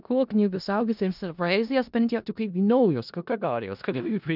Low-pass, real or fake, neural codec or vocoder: 5.4 kHz; fake; codec, 16 kHz in and 24 kHz out, 0.4 kbps, LongCat-Audio-Codec, four codebook decoder